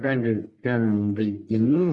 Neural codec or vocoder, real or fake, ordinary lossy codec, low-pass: codec, 44.1 kHz, 1.7 kbps, Pupu-Codec; fake; MP3, 64 kbps; 10.8 kHz